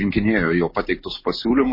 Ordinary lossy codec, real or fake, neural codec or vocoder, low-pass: MP3, 24 kbps; real; none; 5.4 kHz